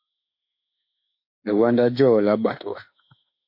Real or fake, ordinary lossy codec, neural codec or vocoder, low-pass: fake; MP3, 32 kbps; autoencoder, 48 kHz, 32 numbers a frame, DAC-VAE, trained on Japanese speech; 5.4 kHz